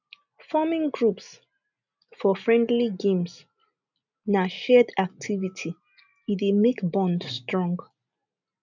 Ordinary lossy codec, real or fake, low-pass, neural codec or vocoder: none; real; 7.2 kHz; none